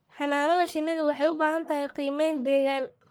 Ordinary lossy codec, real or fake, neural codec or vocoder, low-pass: none; fake; codec, 44.1 kHz, 1.7 kbps, Pupu-Codec; none